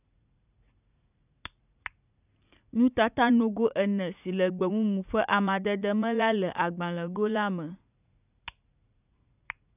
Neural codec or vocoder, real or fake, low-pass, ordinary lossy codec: vocoder, 44.1 kHz, 80 mel bands, Vocos; fake; 3.6 kHz; none